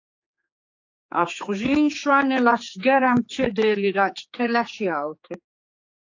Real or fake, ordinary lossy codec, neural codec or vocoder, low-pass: fake; AAC, 48 kbps; codec, 16 kHz, 4 kbps, X-Codec, HuBERT features, trained on general audio; 7.2 kHz